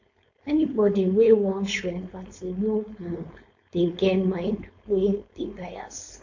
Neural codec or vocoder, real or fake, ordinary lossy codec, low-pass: codec, 16 kHz, 4.8 kbps, FACodec; fake; AAC, 48 kbps; 7.2 kHz